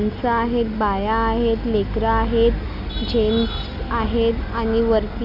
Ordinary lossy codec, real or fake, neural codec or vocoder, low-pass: none; real; none; 5.4 kHz